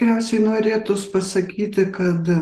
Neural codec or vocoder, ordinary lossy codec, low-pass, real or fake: none; Opus, 16 kbps; 14.4 kHz; real